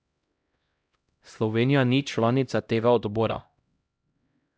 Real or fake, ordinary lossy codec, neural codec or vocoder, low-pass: fake; none; codec, 16 kHz, 0.5 kbps, X-Codec, HuBERT features, trained on LibriSpeech; none